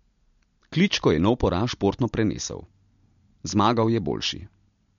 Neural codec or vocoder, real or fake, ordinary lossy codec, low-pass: none; real; MP3, 48 kbps; 7.2 kHz